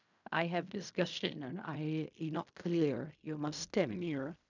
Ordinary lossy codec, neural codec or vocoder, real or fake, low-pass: none; codec, 16 kHz in and 24 kHz out, 0.4 kbps, LongCat-Audio-Codec, fine tuned four codebook decoder; fake; 7.2 kHz